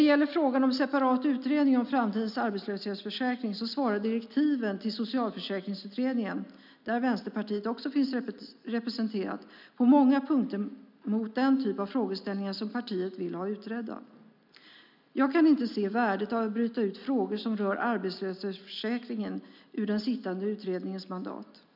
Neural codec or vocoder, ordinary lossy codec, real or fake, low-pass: none; none; real; 5.4 kHz